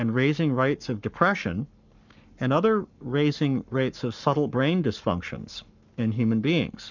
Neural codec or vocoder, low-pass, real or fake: codec, 44.1 kHz, 7.8 kbps, Pupu-Codec; 7.2 kHz; fake